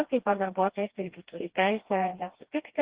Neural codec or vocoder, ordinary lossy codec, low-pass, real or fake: codec, 16 kHz, 1 kbps, FreqCodec, smaller model; Opus, 16 kbps; 3.6 kHz; fake